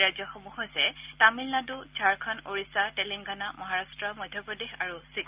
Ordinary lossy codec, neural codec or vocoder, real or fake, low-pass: Opus, 24 kbps; none; real; 3.6 kHz